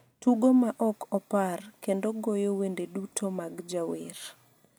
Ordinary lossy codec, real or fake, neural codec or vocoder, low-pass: none; real; none; none